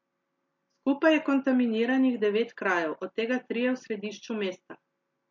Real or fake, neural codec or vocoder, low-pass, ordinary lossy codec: real; none; 7.2 kHz; MP3, 48 kbps